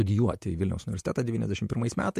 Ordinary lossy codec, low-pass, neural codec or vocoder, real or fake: MP3, 64 kbps; 14.4 kHz; vocoder, 48 kHz, 128 mel bands, Vocos; fake